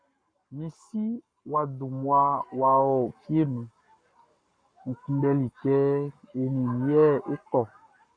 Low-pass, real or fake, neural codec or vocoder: 9.9 kHz; fake; codec, 44.1 kHz, 7.8 kbps, Pupu-Codec